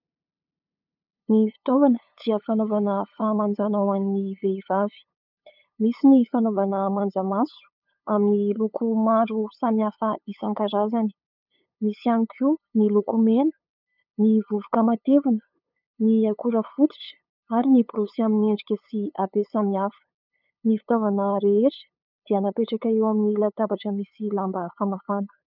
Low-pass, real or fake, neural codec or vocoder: 5.4 kHz; fake; codec, 16 kHz, 8 kbps, FunCodec, trained on LibriTTS, 25 frames a second